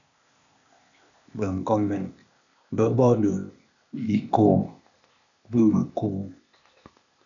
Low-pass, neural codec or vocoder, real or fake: 7.2 kHz; codec, 16 kHz, 0.8 kbps, ZipCodec; fake